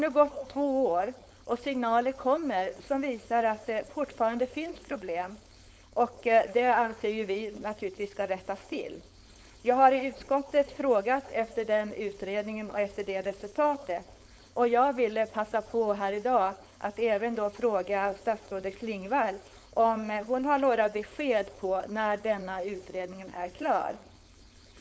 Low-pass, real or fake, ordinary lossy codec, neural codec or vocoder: none; fake; none; codec, 16 kHz, 4.8 kbps, FACodec